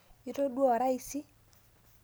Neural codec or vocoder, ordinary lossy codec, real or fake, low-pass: none; none; real; none